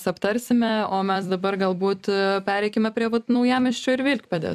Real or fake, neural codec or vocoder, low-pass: fake; vocoder, 44.1 kHz, 128 mel bands every 256 samples, BigVGAN v2; 14.4 kHz